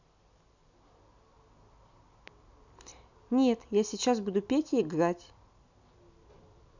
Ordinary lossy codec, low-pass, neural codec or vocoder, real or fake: none; 7.2 kHz; none; real